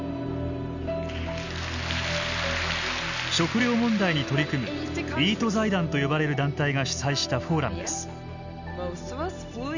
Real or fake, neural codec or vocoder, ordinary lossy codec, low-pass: real; none; none; 7.2 kHz